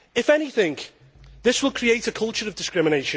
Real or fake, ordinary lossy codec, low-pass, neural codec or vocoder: real; none; none; none